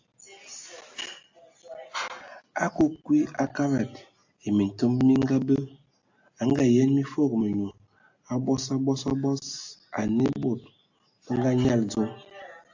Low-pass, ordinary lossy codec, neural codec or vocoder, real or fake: 7.2 kHz; AAC, 48 kbps; none; real